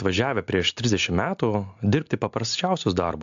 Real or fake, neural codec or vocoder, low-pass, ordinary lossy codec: real; none; 7.2 kHz; AAC, 64 kbps